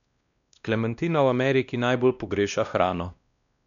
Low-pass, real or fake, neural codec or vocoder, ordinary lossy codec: 7.2 kHz; fake; codec, 16 kHz, 1 kbps, X-Codec, WavLM features, trained on Multilingual LibriSpeech; none